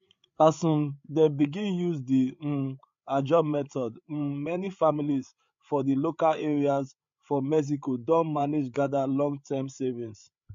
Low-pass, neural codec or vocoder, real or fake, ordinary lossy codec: 7.2 kHz; codec, 16 kHz, 8 kbps, FreqCodec, larger model; fake; MP3, 48 kbps